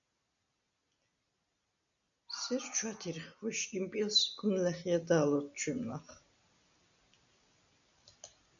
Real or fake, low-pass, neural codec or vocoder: real; 7.2 kHz; none